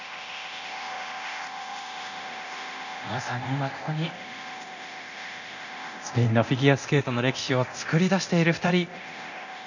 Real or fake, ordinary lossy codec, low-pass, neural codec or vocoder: fake; none; 7.2 kHz; codec, 24 kHz, 0.9 kbps, DualCodec